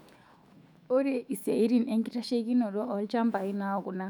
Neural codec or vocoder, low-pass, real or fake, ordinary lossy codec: autoencoder, 48 kHz, 128 numbers a frame, DAC-VAE, trained on Japanese speech; 19.8 kHz; fake; none